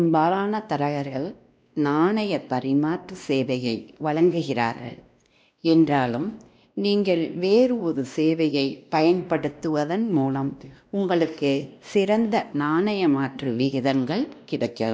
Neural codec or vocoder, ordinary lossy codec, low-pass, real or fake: codec, 16 kHz, 1 kbps, X-Codec, WavLM features, trained on Multilingual LibriSpeech; none; none; fake